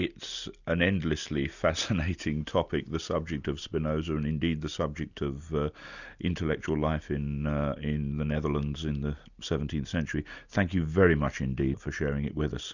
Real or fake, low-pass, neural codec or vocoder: real; 7.2 kHz; none